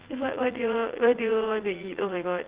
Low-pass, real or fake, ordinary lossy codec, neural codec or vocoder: 3.6 kHz; fake; Opus, 16 kbps; vocoder, 44.1 kHz, 80 mel bands, Vocos